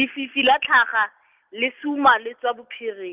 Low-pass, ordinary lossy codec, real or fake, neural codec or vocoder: 3.6 kHz; Opus, 24 kbps; real; none